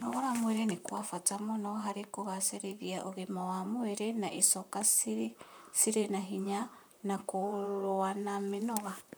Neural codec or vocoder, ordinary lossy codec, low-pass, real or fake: vocoder, 44.1 kHz, 128 mel bands, Pupu-Vocoder; none; none; fake